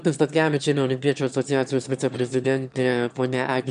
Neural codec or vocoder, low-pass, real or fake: autoencoder, 22.05 kHz, a latent of 192 numbers a frame, VITS, trained on one speaker; 9.9 kHz; fake